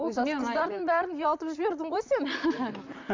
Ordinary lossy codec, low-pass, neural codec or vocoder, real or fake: MP3, 64 kbps; 7.2 kHz; vocoder, 44.1 kHz, 128 mel bands, Pupu-Vocoder; fake